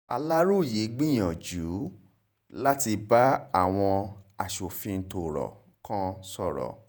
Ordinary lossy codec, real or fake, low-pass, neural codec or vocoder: none; fake; none; vocoder, 48 kHz, 128 mel bands, Vocos